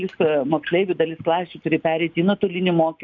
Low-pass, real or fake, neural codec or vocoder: 7.2 kHz; real; none